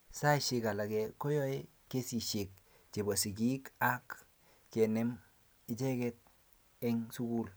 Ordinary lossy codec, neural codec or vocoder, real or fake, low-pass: none; none; real; none